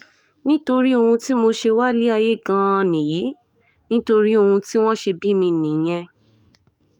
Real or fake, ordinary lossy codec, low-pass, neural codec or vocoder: fake; none; 19.8 kHz; codec, 44.1 kHz, 7.8 kbps, DAC